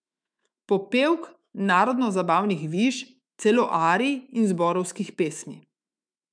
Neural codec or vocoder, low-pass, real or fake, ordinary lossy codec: autoencoder, 48 kHz, 128 numbers a frame, DAC-VAE, trained on Japanese speech; 9.9 kHz; fake; none